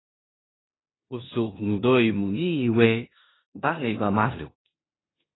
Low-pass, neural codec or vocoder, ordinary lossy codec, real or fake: 7.2 kHz; codec, 16 kHz in and 24 kHz out, 0.9 kbps, LongCat-Audio-Codec, four codebook decoder; AAC, 16 kbps; fake